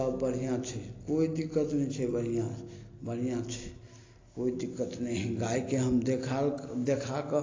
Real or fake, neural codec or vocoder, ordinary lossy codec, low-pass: real; none; AAC, 32 kbps; 7.2 kHz